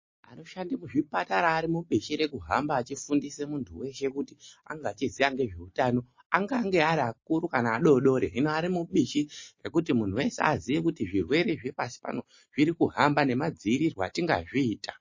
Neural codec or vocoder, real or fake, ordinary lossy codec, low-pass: none; real; MP3, 32 kbps; 7.2 kHz